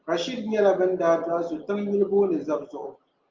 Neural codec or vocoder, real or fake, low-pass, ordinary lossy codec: none; real; 7.2 kHz; Opus, 32 kbps